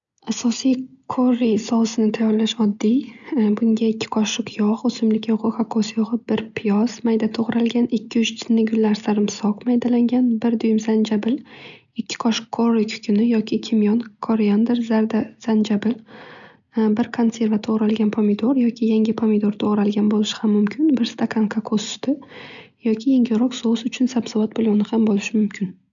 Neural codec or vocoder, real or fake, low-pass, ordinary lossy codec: none; real; 7.2 kHz; none